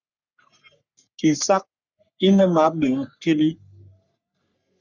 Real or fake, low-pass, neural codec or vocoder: fake; 7.2 kHz; codec, 44.1 kHz, 3.4 kbps, Pupu-Codec